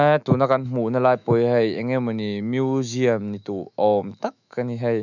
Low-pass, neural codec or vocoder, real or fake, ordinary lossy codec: 7.2 kHz; autoencoder, 48 kHz, 128 numbers a frame, DAC-VAE, trained on Japanese speech; fake; none